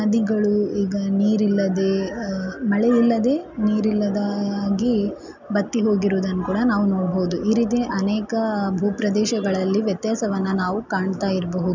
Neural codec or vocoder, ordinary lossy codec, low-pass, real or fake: none; none; 7.2 kHz; real